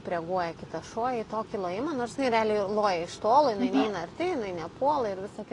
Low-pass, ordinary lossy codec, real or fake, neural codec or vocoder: 10.8 kHz; AAC, 32 kbps; real; none